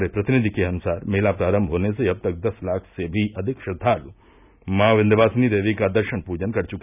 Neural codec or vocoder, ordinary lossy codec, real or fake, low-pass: none; none; real; 3.6 kHz